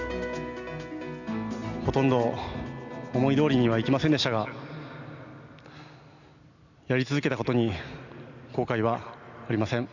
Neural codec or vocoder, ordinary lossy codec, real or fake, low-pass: none; none; real; 7.2 kHz